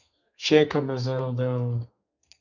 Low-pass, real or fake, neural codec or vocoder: 7.2 kHz; fake; codec, 32 kHz, 1.9 kbps, SNAC